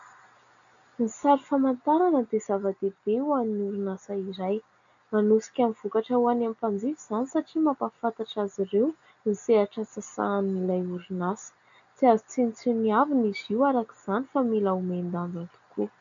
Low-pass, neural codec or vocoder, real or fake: 7.2 kHz; none; real